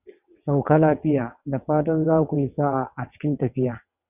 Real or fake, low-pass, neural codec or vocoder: fake; 3.6 kHz; vocoder, 22.05 kHz, 80 mel bands, WaveNeXt